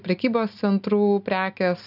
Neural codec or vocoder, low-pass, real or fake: none; 5.4 kHz; real